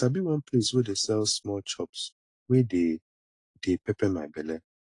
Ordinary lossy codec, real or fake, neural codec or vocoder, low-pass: AAC, 48 kbps; real; none; 10.8 kHz